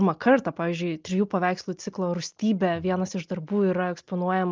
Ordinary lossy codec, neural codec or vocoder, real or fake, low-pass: Opus, 24 kbps; none; real; 7.2 kHz